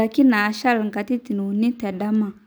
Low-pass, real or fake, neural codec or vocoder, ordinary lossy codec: none; real; none; none